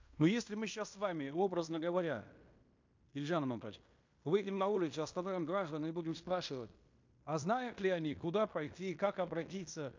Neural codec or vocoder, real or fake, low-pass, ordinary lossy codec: codec, 16 kHz in and 24 kHz out, 0.9 kbps, LongCat-Audio-Codec, fine tuned four codebook decoder; fake; 7.2 kHz; MP3, 48 kbps